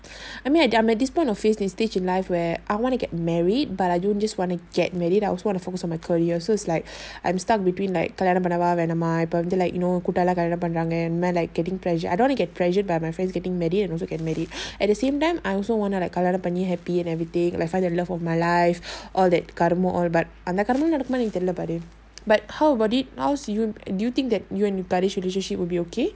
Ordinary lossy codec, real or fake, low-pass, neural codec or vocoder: none; real; none; none